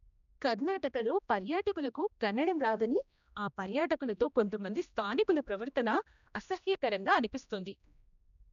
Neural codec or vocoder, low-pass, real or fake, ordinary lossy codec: codec, 16 kHz, 1 kbps, X-Codec, HuBERT features, trained on general audio; 7.2 kHz; fake; none